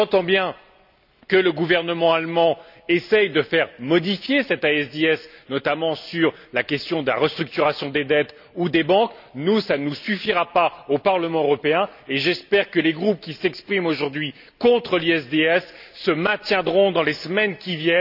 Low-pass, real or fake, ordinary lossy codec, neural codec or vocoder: 5.4 kHz; real; none; none